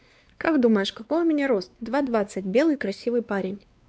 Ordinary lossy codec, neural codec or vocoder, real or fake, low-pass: none; codec, 16 kHz, 2 kbps, X-Codec, WavLM features, trained on Multilingual LibriSpeech; fake; none